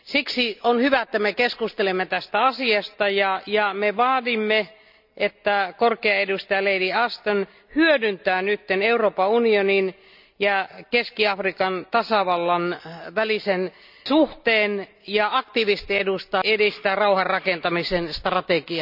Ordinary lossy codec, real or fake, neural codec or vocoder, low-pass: none; real; none; 5.4 kHz